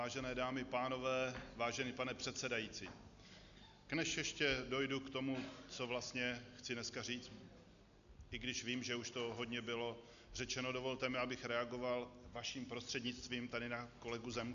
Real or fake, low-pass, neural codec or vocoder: real; 7.2 kHz; none